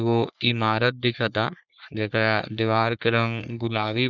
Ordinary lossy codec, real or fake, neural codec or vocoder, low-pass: none; fake; codec, 44.1 kHz, 3.4 kbps, Pupu-Codec; 7.2 kHz